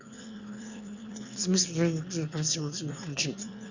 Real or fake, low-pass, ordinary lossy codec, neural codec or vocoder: fake; 7.2 kHz; Opus, 64 kbps; autoencoder, 22.05 kHz, a latent of 192 numbers a frame, VITS, trained on one speaker